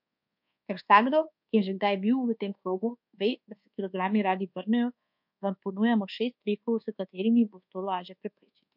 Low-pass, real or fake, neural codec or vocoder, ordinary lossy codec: 5.4 kHz; fake; codec, 24 kHz, 1.2 kbps, DualCodec; MP3, 48 kbps